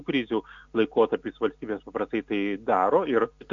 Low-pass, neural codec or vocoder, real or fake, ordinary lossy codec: 7.2 kHz; none; real; AAC, 64 kbps